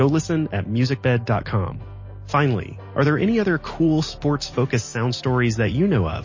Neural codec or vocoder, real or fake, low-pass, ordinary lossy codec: none; real; 7.2 kHz; MP3, 32 kbps